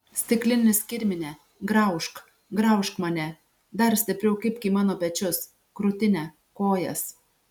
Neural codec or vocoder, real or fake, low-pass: none; real; 19.8 kHz